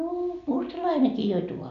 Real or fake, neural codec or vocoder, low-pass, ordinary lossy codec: real; none; 7.2 kHz; none